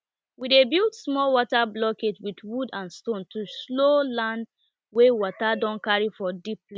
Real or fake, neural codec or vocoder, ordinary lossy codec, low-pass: real; none; none; none